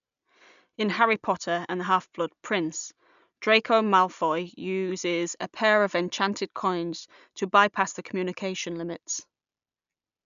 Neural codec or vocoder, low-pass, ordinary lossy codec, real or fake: none; 7.2 kHz; none; real